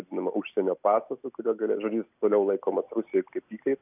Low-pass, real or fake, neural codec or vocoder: 3.6 kHz; real; none